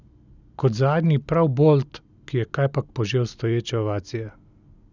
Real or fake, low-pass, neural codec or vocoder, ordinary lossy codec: real; 7.2 kHz; none; none